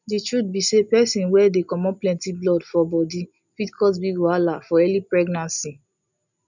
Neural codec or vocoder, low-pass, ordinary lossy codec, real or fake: none; 7.2 kHz; none; real